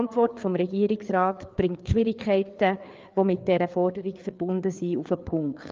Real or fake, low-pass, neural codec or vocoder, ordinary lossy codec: fake; 7.2 kHz; codec, 16 kHz, 4 kbps, FreqCodec, larger model; Opus, 32 kbps